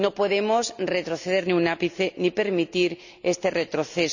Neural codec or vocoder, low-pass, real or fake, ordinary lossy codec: none; 7.2 kHz; real; none